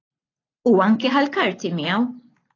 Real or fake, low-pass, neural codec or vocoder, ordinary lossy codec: real; 7.2 kHz; none; AAC, 32 kbps